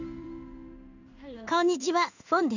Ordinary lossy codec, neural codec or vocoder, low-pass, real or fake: none; codec, 16 kHz in and 24 kHz out, 1 kbps, XY-Tokenizer; 7.2 kHz; fake